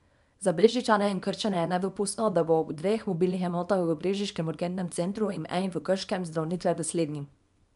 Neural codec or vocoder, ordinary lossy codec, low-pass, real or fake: codec, 24 kHz, 0.9 kbps, WavTokenizer, small release; none; 10.8 kHz; fake